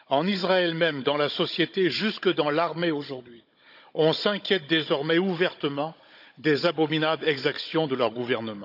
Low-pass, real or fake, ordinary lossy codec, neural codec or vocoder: 5.4 kHz; fake; none; codec, 16 kHz, 16 kbps, FunCodec, trained on Chinese and English, 50 frames a second